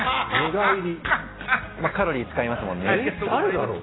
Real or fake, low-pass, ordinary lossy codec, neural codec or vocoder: real; 7.2 kHz; AAC, 16 kbps; none